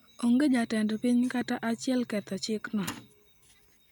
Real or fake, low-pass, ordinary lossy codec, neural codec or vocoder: real; 19.8 kHz; none; none